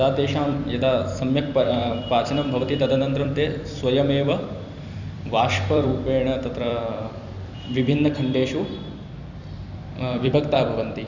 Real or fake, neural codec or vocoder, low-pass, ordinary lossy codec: fake; vocoder, 44.1 kHz, 128 mel bands every 256 samples, BigVGAN v2; 7.2 kHz; none